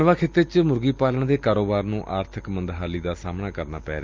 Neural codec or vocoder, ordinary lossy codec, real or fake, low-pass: none; Opus, 24 kbps; real; 7.2 kHz